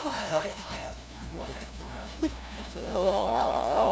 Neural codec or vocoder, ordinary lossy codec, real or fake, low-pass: codec, 16 kHz, 0.5 kbps, FunCodec, trained on LibriTTS, 25 frames a second; none; fake; none